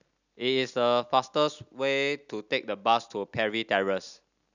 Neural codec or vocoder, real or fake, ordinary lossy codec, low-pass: none; real; none; 7.2 kHz